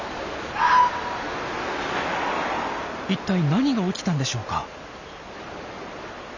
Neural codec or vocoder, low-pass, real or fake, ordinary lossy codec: none; 7.2 kHz; real; none